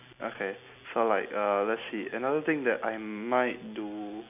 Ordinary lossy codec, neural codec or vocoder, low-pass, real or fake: none; none; 3.6 kHz; real